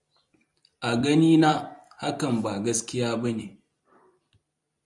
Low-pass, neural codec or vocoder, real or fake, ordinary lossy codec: 10.8 kHz; vocoder, 44.1 kHz, 128 mel bands every 256 samples, BigVGAN v2; fake; MP3, 96 kbps